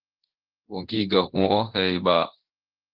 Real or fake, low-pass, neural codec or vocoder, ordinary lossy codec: fake; 5.4 kHz; codec, 24 kHz, 0.9 kbps, DualCodec; Opus, 16 kbps